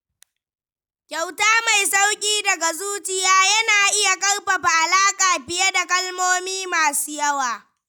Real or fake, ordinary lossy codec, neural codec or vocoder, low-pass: real; none; none; none